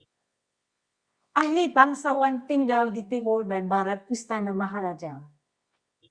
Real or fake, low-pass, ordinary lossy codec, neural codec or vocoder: fake; 9.9 kHz; Opus, 64 kbps; codec, 24 kHz, 0.9 kbps, WavTokenizer, medium music audio release